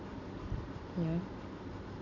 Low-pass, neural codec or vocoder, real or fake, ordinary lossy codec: 7.2 kHz; none; real; none